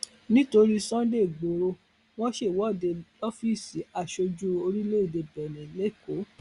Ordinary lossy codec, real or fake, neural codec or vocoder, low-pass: Opus, 64 kbps; real; none; 10.8 kHz